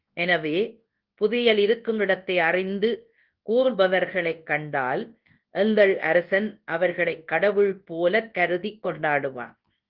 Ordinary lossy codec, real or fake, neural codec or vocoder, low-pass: Opus, 16 kbps; fake; codec, 24 kHz, 0.9 kbps, WavTokenizer, large speech release; 5.4 kHz